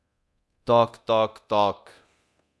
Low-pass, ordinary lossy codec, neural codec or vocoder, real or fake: none; none; codec, 24 kHz, 0.9 kbps, DualCodec; fake